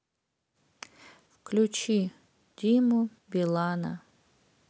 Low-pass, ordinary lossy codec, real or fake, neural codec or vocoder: none; none; real; none